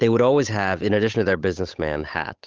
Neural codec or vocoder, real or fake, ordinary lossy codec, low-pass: codec, 16 kHz, 8 kbps, FunCodec, trained on Chinese and English, 25 frames a second; fake; Opus, 32 kbps; 7.2 kHz